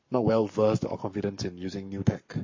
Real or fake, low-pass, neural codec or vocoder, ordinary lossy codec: fake; 7.2 kHz; codec, 44.1 kHz, 7.8 kbps, Pupu-Codec; MP3, 32 kbps